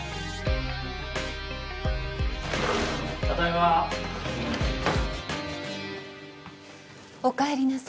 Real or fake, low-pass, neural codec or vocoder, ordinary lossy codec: real; none; none; none